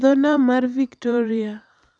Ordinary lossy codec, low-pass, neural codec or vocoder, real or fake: none; 9.9 kHz; vocoder, 44.1 kHz, 128 mel bands every 512 samples, BigVGAN v2; fake